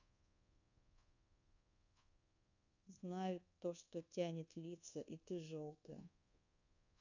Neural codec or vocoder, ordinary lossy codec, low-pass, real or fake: codec, 24 kHz, 1.2 kbps, DualCodec; none; 7.2 kHz; fake